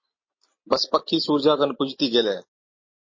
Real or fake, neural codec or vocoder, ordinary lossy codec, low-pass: real; none; MP3, 32 kbps; 7.2 kHz